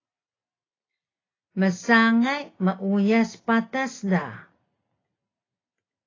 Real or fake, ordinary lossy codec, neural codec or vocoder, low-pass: real; AAC, 32 kbps; none; 7.2 kHz